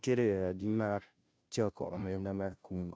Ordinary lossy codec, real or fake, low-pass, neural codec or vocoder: none; fake; none; codec, 16 kHz, 0.5 kbps, FunCodec, trained on Chinese and English, 25 frames a second